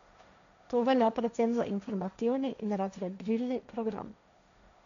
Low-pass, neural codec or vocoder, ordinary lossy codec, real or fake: 7.2 kHz; codec, 16 kHz, 1.1 kbps, Voila-Tokenizer; none; fake